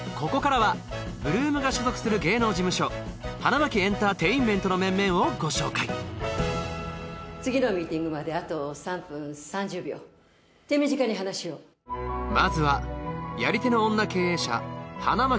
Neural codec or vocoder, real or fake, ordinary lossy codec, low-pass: none; real; none; none